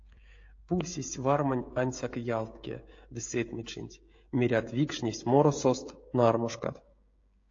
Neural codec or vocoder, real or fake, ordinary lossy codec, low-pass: codec, 16 kHz, 16 kbps, FreqCodec, smaller model; fake; AAC, 48 kbps; 7.2 kHz